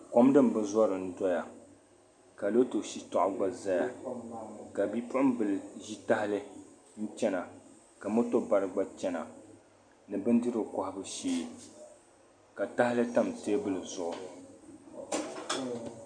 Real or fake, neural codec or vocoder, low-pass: real; none; 9.9 kHz